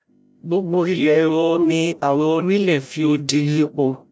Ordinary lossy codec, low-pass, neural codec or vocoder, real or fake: none; none; codec, 16 kHz, 0.5 kbps, FreqCodec, larger model; fake